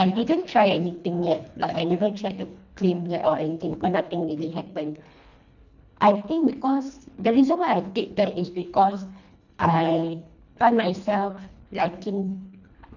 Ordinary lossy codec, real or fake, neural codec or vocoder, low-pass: none; fake; codec, 24 kHz, 1.5 kbps, HILCodec; 7.2 kHz